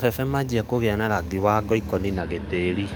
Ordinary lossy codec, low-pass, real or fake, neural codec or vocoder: none; none; fake; codec, 44.1 kHz, 7.8 kbps, DAC